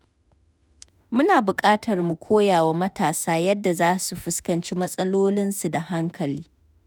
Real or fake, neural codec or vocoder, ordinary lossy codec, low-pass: fake; autoencoder, 48 kHz, 32 numbers a frame, DAC-VAE, trained on Japanese speech; none; none